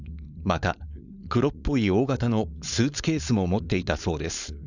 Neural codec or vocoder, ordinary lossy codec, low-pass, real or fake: codec, 16 kHz, 4.8 kbps, FACodec; none; 7.2 kHz; fake